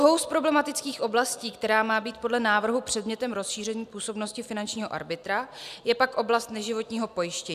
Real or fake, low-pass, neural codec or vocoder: real; 14.4 kHz; none